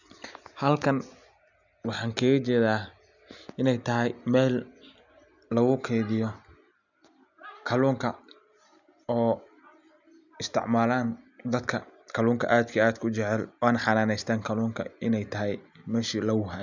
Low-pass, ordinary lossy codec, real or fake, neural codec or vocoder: 7.2 kHz; none; real; none